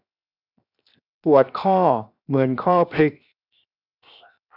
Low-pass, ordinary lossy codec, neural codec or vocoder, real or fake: 5.4 kHz; none; codec, 16 kHz, 0.7 kbps, FocalCodec; fake